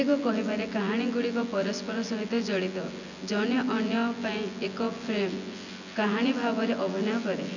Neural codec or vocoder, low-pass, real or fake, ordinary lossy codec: vocoder, 24 kHz, 100 mel bands, Vocos; 7.2 kHz; fake; none